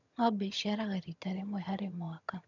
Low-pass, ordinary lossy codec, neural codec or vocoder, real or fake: 7.2 kHz; none; vocoder, 22.05 kHz, 80 mel bands, HiFi-GAN; fake